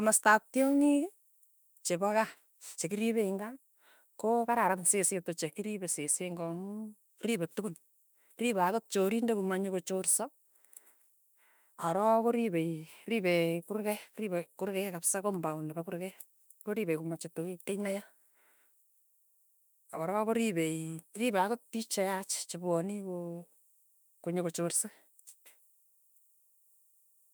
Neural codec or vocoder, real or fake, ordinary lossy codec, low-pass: autoencoder, 48 kHz, 32 numbers a frame, DAC-VAE, trained on Japanese speech; fake; none; none